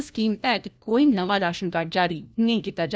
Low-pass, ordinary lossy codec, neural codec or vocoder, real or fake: none; none; codec, 16 kHz, 1 kbps, FunCodec, trained on LibriTTS, 50 frames a second; fake